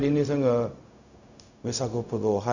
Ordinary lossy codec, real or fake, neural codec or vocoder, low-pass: none; fake; codec, 16 kHz, 0.4 kbps, LongCat-Audio-Codec; 7.2 kHz